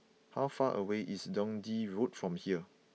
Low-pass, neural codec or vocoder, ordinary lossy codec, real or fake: none; none; none; real